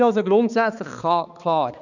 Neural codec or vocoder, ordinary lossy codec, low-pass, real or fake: codec, 16 kHz, 2 kbps, X-Codec, HuBERT features, trained on balanced general audio; none; 7.2 kHz; fake